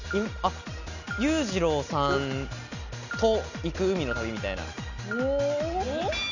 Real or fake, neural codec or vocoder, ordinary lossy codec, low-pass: real; none; none; 7.2 kHz